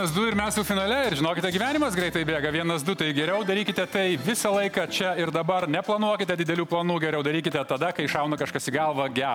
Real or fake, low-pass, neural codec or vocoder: real; 19.8 kHz; none